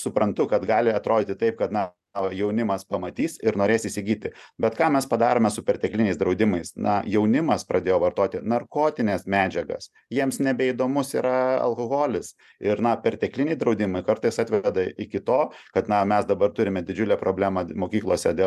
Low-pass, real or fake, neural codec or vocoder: 14.4 kHz; real; none